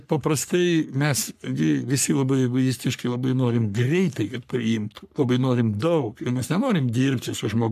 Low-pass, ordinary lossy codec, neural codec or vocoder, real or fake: 14.4 kHz; MP3, 96 kbps; codec, 44.1 kHz, 3.4 kbps, Pupu-Codec; fake